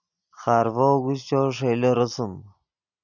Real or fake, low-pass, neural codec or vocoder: real; 7.2 kHz; none